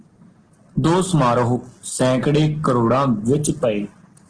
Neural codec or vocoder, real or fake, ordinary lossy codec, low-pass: none; real; Opus, 16 kbps; 9.9 kHz